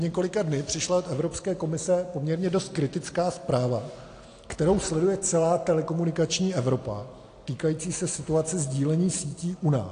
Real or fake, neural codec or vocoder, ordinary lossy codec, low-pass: real; none; AAC, 48 kbps; 9.9 kHz